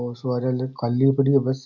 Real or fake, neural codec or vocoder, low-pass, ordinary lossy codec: real; none; 7.2 kHz; none